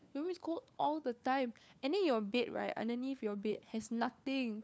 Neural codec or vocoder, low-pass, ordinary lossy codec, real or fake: codec, 16 kHz, 4 kbps, FunCodec, trained on LibriTTS, 50 frames a second; none; none; fake